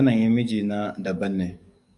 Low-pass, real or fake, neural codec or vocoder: 10.8 kHz; fake; codec, 44.1 kHz, 7.8 kbps, DAC